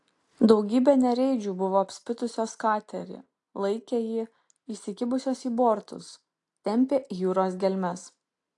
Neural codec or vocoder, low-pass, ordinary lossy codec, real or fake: none; 10.8 kHz; AAC, 48 kbps; real